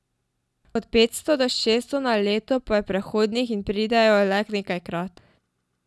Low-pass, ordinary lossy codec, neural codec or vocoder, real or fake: none; none; none; real